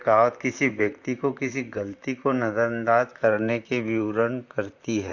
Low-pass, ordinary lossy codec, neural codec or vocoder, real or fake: 7.2 kHz; Opus, 64 kbps; vocoder, 44.1 kHz, 128 mel bands, Pupu-Vocoder; fake